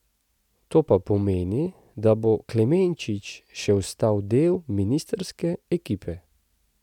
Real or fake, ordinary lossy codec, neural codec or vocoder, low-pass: real; none; none; 19.8 kHz